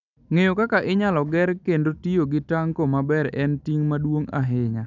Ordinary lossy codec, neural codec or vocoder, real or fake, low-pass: none; none; real; 7.2 kHz